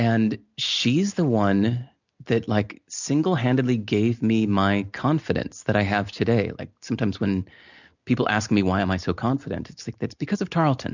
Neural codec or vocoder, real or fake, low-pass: none; real; 7.2 kHz